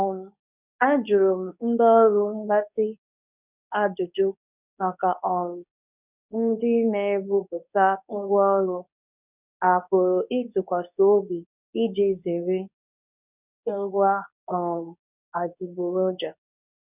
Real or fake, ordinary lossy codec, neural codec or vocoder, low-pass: fake; none; codec, 24 kHz, 0.9 kbps, WavTokenizer, medium speech release version 2; 3.6 kHz